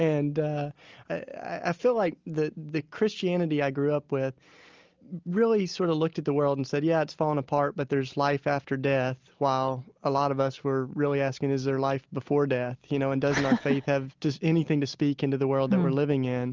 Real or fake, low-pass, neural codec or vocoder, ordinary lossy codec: real; 7.2 kHz; none; Opus, 32 kbps